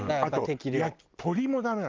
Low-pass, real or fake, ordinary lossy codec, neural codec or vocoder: 7.2 kHz; real; Opus, 32 kbps; none